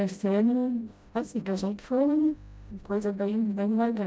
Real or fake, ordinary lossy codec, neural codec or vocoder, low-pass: fake; none; codec, 16 kHz, 0.5 kbps, FreqCodec, smaller model; none